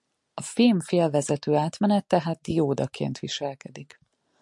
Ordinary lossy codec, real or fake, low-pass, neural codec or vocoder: MP3, 64 kbps; real; 10.8 kHz; none